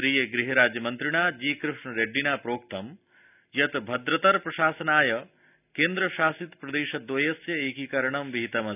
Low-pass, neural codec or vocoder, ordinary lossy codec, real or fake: 3.6 kHz; none; none; real